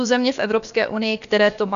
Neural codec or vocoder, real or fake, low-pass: codec, 16 kHz, about 1 kbps, DyCAST, with the encoder's durations; fake; 7.2 kHz